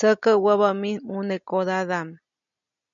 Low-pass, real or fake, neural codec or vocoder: 7.2 kHz; real; none